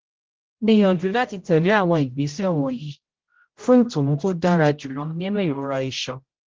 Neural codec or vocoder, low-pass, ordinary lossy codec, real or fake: codec, 16 kHz, 0.5 kbps, X-Codec, HuBERT features, trained on general audio; 7.2 kHz; Opus, 16 kbps; fake